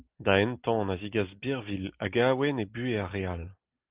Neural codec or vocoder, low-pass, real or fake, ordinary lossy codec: none; 3.6 kHz; real; Opus, 32 kbps